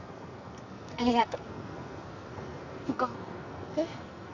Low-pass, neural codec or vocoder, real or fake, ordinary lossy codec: 7.2 kHz; codec, 44.1 kHz, 2.6 kbps, SNAC; fake; none